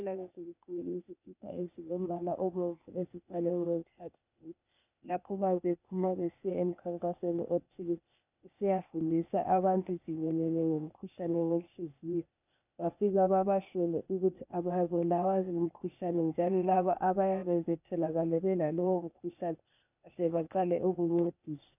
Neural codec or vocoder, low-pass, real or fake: codec, 16 kHz, 0.8 kbps, ZipCodec; 3.6 kHz; fake